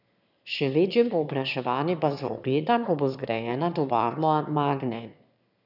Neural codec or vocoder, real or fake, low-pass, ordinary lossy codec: autoencoder, 22.05 kHz, a latent of 192 numbers a frame, VITS, trained on one speaker; fake; 5.4 kHz; none